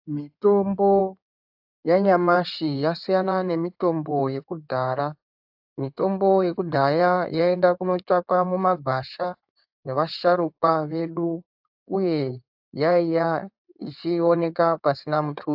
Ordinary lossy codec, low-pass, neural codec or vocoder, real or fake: AAC, 48 kbps; 5.4 kHz; codec, 16 kHz in and 24 kHz out, 2.2 kbps, FireRedTTS-2 codec; fake